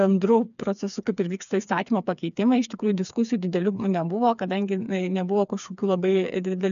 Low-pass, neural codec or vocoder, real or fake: 7.2 kHz; codec, 16 kHz, 4 kbps, FreqCodec, smaller model; fake